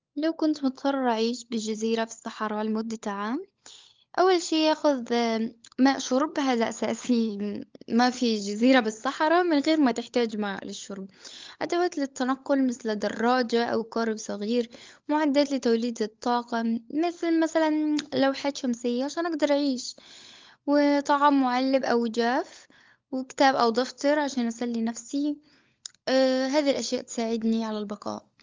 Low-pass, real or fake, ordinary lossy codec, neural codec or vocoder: 7.2 kHz; fake; Opus, 32 kbps; codec, 16 kHz, 16 kbps, FunCodec, trained on LibriTTS, 50 frames a second